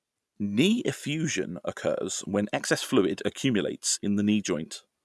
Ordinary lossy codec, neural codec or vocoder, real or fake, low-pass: none; none; real; none